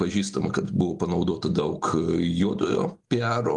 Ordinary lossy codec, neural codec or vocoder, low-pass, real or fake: Opus, 32 kbps; none; 10.8 kHz; real